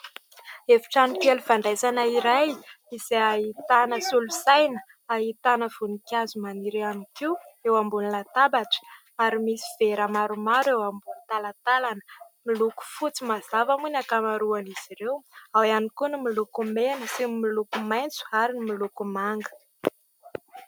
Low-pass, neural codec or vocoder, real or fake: 19.8 kHz; none; real